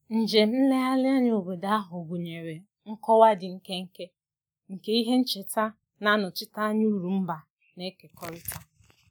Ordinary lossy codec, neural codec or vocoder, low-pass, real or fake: none; none; 19.8 kHz; real